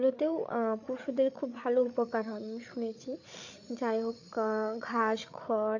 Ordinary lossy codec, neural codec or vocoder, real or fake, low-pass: none; codec, 16 kHz, 8 kbps, FreqCodec, larger model; fake; 7.2 kHz